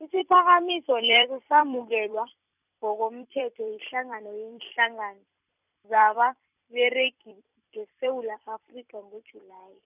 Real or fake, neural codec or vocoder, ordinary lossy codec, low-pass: fake; vocoder, 44.1 kHz, 128 mel bands every 256 samples, BigVGAN v2; none; 3.6 kHz